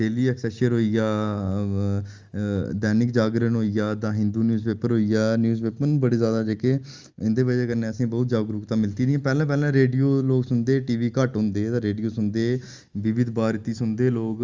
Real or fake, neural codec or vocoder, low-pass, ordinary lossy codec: real; none; 7.2 kHz; Opus, 32 kbps